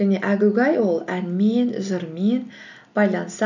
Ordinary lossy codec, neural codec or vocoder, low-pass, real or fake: none; none; 7.2 kHz; real